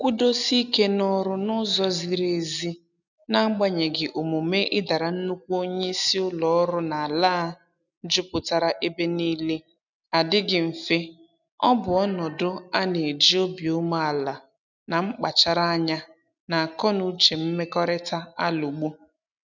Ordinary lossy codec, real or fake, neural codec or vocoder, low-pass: none; real; none; 7.2 kHz